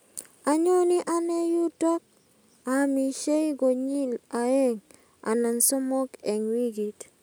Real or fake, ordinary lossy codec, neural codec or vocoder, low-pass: real; none; none; none